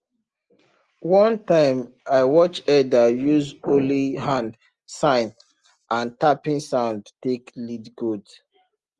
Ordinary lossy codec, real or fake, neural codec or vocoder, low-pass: Opus, 24 kbps; real; none; 10.8 kHz